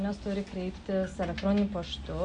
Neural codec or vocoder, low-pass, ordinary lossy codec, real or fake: none; 9.9 kHz; MP3, 96 kbps; real